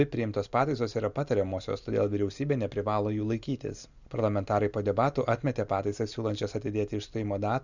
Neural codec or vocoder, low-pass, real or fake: none; 7.2 kHz; real